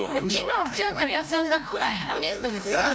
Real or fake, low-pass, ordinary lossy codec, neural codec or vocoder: fake; none; none; codec, 16 kHz, 0.5 kbps, FreqCodec, larger model